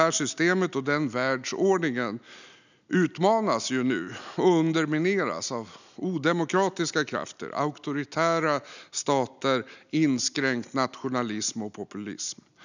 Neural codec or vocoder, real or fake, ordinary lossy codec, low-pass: none; real; none; 7.2 kHz